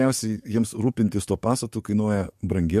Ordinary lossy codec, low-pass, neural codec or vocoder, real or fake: MP3, 64 kbps; 14.4 kHz; codec, 44.1 kHz, 7.8 kbps, DAC; fake